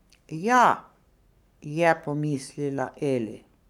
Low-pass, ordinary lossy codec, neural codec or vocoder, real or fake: 19.8 kHz; none; codec, 44.1 kHz, 7.8 kbps, Pupu-Codec; fake